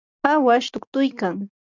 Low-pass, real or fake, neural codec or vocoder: 7.2 kHz; real; none